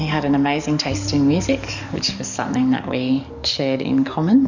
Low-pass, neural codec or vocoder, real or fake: 7.2 kHz; codec, 44.1 kHz, 7.8 kbps, DAC; fake